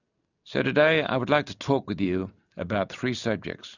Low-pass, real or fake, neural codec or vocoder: 7.2 kHz; real; none